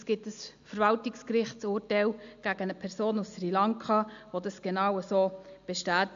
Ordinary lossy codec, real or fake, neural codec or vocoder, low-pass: AAC, 64 kbps; real; none; 7.2 kHz